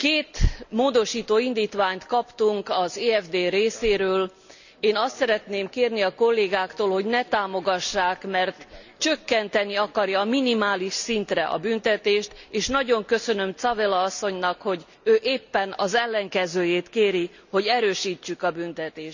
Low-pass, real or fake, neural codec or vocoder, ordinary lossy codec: 7.2 kHz; real; none; none